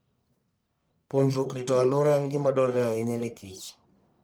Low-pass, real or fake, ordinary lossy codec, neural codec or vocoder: none; fake; none; codec, 44.1 kHz, 1.7 kbps, Pupu-Codec